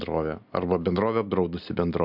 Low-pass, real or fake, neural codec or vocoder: 5.4 kHz; real; none